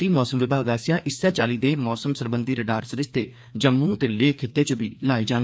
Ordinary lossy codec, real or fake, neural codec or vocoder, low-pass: none; fake; codec, 16 kHz, 2 kbps, FreqCodec, larger model; none